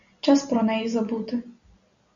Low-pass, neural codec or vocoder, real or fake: 7.2 kHz; none; real